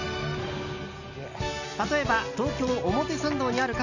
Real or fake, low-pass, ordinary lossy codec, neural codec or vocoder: real; 7.2 kHz; none; none